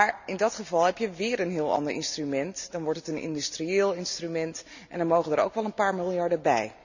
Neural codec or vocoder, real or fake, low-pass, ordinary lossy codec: none; real; 7.2 kHz; none